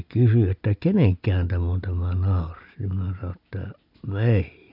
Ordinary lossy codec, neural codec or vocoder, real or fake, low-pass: none; none; real; 5.4 kHz